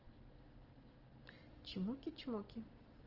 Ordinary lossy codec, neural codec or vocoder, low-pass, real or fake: Opus, 16 kbps; none; 5.4 kHz; real